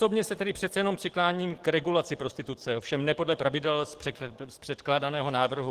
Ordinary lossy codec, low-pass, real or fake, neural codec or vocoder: Opus, 16 kbps; 14.4 kHz; fake; codec, 44.1 kHz, 7.8 kbps, DAC